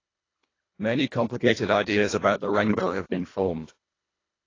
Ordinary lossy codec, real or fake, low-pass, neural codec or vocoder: AAC, 32 kbps; fake; 7.2 kHz; codec, 24 kHz, 1.5 kbps, HILCodec